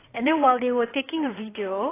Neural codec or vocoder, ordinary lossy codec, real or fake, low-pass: codec, 16 kHz in and 24 kHz out, 2.2 kbps, FireRedTTS-2 codec; AAC, 16 kbps; fake; 3.6 kHz